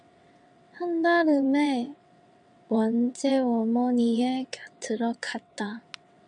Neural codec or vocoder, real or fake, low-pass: vocoder, 22.05 kHz, 80 mel bands, WaveNeXt; fake; 9.9 kHz